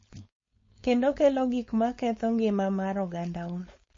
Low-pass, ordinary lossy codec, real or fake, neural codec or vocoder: 7.2 kHz; MP3, 32 kbps; fake; codec, 16 kHz, 4.8 kbps, FACodec